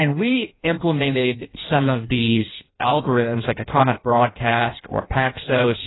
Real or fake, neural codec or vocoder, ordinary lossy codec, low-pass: fake; codec, 16 kHz in and 24 kHz out, 0.6 kbps, FireRedTTS-2 codec; AAC, 16 kbps; 7.2 kHz